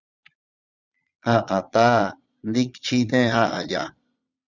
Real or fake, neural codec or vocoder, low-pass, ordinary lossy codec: fake; vocoder, 22.05 kHz, 80 mel bands, Vocos; 7.2 kHz; Opus, 64 kbps